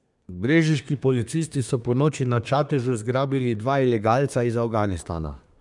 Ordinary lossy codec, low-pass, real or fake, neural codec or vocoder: none; 10.8 kHz; fake; codec, 24 kHz, 1 kbps, SNAC